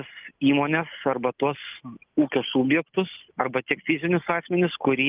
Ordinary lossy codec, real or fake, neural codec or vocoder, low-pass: Opus, 24 kbps; real; none; 3.6 kHz